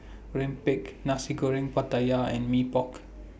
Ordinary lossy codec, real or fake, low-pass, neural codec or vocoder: none; real; none; none